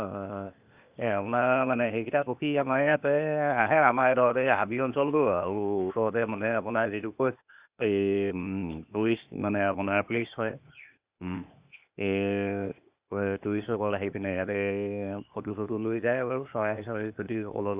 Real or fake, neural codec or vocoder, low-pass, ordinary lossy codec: fake; codec, 16 kHz, 0.8 kbps, ZipCodec; 3.6 kHz; Opus, 64 kbps